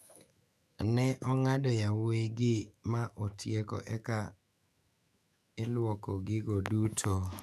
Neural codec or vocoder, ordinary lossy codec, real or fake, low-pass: autoencoder, 48 kHz, 128 numbers a frame, DAC-VAE, trained on Japanese speech; none; fake; 14.4 kHz